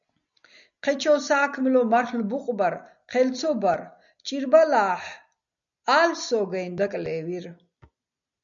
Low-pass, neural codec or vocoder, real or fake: 7.2 kHz; none; real